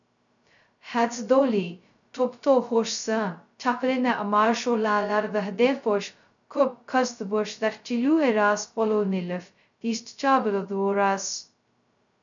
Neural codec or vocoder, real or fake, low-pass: codec, 16 kHz, 0.2 kbps, FocalCodec; fake; 7.2 kHz